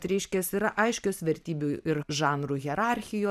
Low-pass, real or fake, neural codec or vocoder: 14.4 kHz; fake; vocoder, 44.1 kHz, 128 mel bands every 512 samples, BigVGAN v2